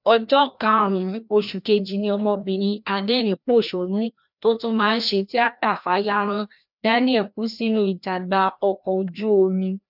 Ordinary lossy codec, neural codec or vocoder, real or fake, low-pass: none; codec, 16 kHz, 1 kbps, FreqCodec, larger model; fake; 5.4 kHz